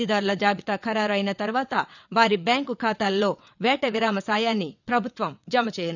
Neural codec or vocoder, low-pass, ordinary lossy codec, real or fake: vocoder, 22.05 kHz, 80 mel bands, WaveNeXt; 7.2 kHz; none; fake